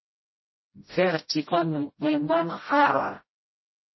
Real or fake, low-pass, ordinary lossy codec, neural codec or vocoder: fake; 7.2 kHz; MP3, 24 kbps; codec, 16 kHz, 0.5 kbps, FreqCodec, smaller model